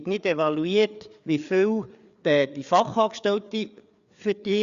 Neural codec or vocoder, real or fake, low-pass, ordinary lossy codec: codec, 16 kHz, 4 kbps, FreqCodec, larger model; fake; 7.2 kHz; Opus, 64 kbps